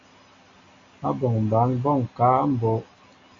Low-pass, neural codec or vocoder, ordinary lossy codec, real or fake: 7.2 kHz; none; MP3, 48 kbps; real